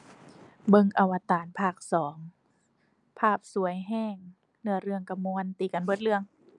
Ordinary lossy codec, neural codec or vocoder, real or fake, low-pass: none; none; real; 10.8 kHz